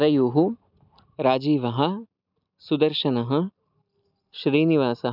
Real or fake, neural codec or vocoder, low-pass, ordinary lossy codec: real; none; 5.4 kHz; none